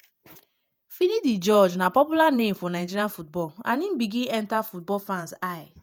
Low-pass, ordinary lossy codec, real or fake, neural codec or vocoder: none; none; fake; vocoder, 48 kHz, 128 mel bands, Vocos